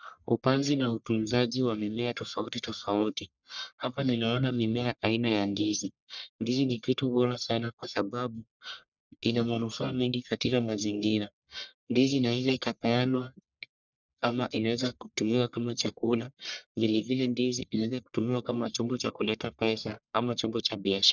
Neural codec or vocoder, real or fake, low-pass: codec, 44.1 kHz, 1.7 kbps, Pupu-Codec; fake; 7.2 kHz